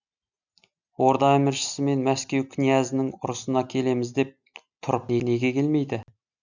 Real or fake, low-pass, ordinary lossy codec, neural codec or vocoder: real; 7.2 kHz; none; none